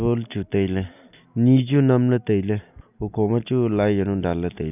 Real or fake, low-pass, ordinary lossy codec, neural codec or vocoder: real; 3.6 kHz; none; none